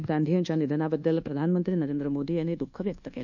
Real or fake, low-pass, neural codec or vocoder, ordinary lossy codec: fake; 7.2 kHz; codec, 24 kHz, 1.2 kbps, DualCodec; none